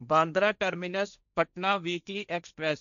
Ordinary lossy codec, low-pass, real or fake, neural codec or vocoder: none; 7.2 kHz; fake; codec, 16 kHz, 1.1 kbps, Voila-Tokenizer